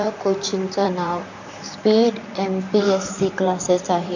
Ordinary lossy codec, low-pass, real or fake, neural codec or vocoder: none; 7.2 kHz; fake; vocoder, 44.1 kHz, 128 mel bands, Pupu-Vocoder